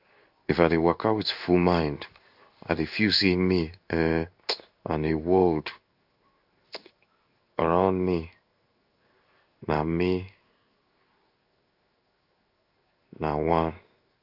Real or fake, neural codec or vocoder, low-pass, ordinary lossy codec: fake; codec, 16 kHz in and 24 kHz out, 1 kbps, XY-Tokenizer; 5.4 kHz; AAC, 48 kbps